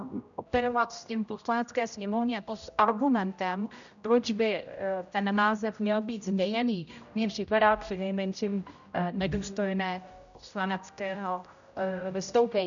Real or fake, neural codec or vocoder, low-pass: fake; codec, 16 kHz, 0.5 kbps, X-Codec, HuBERT features, trained on general audio; 7.2 kHz